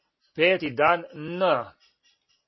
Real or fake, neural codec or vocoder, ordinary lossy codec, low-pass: real; none; MP3, 24 kbps; 7.2 kHz